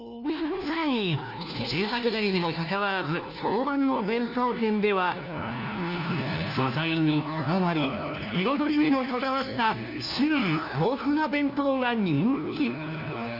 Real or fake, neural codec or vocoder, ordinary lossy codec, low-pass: fake; codec, 16 kHz, 1 kbps, FunCodec, trained on LibriTTS, 50 frames a second; none; 5.4 kHz